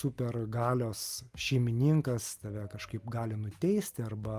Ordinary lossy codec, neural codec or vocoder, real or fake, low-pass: Opus, 32 kbps; none; real; 14.4 kHz